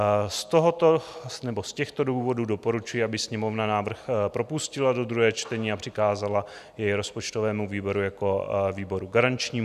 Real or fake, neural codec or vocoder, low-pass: real; none; 14.4 kHz